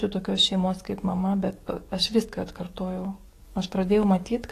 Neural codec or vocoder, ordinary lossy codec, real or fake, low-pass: codec, 44.1 kHz, 7.8 kbps, DAC; AAC, 48 kbps; fake; 14.4 kHz